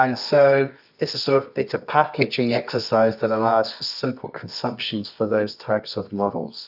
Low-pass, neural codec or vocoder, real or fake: 5.4 kHz; codec, 24 kHz, 0.9 kbps, WavTokenizer, medium music audio release; fake